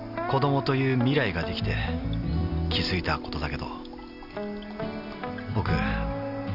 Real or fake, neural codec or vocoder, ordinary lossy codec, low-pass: real; none; none; 5.4 kHz